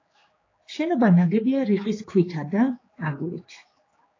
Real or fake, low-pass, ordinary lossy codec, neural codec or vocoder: fake; 7.2 kHz; AAC, 32 kbps; codec, 16 kHz, 4 kbps, X-Codec, HuBERT features, trained on general audio